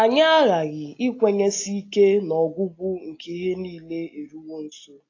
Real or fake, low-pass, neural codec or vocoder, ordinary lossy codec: real; 7.2 kHz; none; AAC, 32 kbps